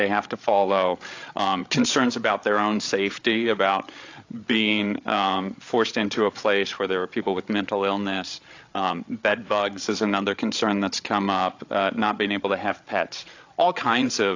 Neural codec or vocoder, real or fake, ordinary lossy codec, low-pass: codec, 16 kHz, 8 kbps, FreqCodec, larger model; fake; AAC, 48 kbps; 7.2 kHz